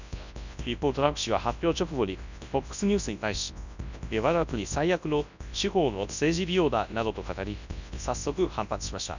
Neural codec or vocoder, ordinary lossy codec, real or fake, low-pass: codec, 24 kHz, 0.9 kbps, WavTokenizer, large speech release; none; fake; 7.2 kHz